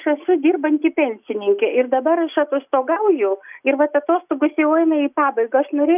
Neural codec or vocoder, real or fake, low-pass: none; real; 3.6 kHz